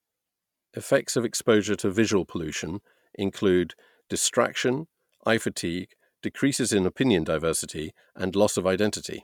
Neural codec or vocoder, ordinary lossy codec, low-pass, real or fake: none; none; 19.8 kHz; real